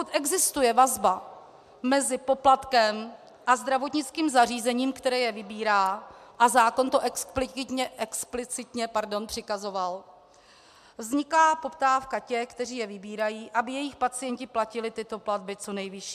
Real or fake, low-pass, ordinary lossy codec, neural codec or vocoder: real; 14.4 kHz; MP3, 96 kbps; none